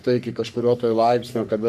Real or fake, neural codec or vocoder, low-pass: fake; codec, 44.1 kHz, 3.4 kbps, Pupu-Codec; 14.4 kHz